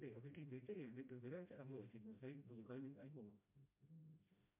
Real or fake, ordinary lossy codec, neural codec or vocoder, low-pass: fake; MP3, 32 kbps; codec, 16 kHz, 0.5 kbps, FreqCodec, smaller model; 3.6 kHz